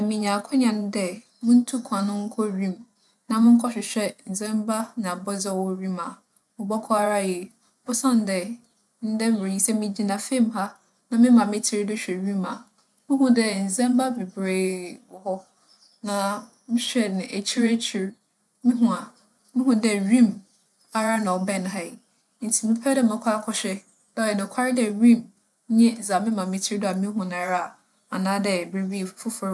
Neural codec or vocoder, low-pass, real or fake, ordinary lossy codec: vocoder, 24 kHz, 100 mel bands, Vocos; none; fake; none